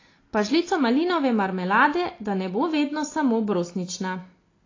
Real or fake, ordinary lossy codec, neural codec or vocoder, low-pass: real; AAC, 32 kbps; none; 7.2 kHz